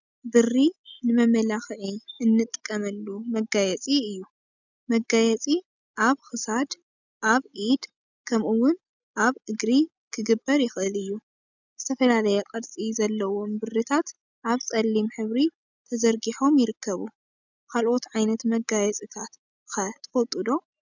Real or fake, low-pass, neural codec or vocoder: real; 7.2 kHz; none